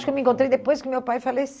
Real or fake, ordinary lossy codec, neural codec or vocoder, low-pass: real; none; none; none